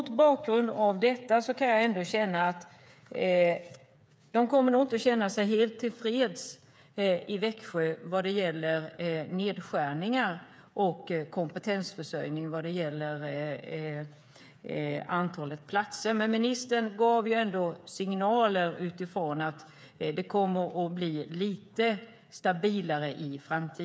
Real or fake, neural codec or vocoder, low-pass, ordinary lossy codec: fake; codec, 16 kHz, 8 kbps, FreqCodec, smaller model; none; none